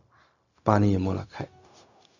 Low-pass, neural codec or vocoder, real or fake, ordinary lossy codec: 7.2 kHz; codec, 16 kHz, 0.4 kbps, LongCat-Audio-Codec; fake; none